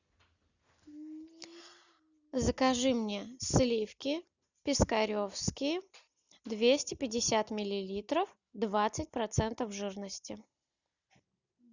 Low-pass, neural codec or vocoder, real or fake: 7.2 kHz; none; real